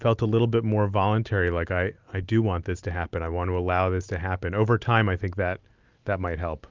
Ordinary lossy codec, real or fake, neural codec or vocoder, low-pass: Opus, 24 kbps; real; none; 7.2 kHz